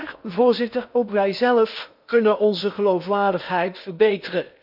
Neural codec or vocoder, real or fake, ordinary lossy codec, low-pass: codec, 16 kHz in and 24 kHz out, 0.8 kbps, FocalCodec, streaming, 65536 codes; fake; none; 5.4 kHz